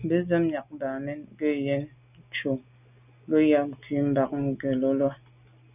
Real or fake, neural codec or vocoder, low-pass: real; none; 3.6 kHz